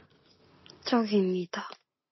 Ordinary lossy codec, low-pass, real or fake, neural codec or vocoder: MP3, 24 kbps; 7.2 kHz; real; none